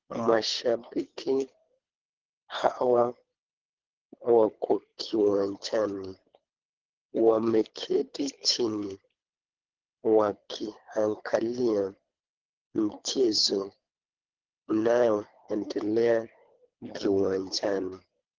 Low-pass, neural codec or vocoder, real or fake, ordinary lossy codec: 7.2 kHz; codec, 24 kHz, 3 kbps, HILCodec; fake; Opus, 16 kbps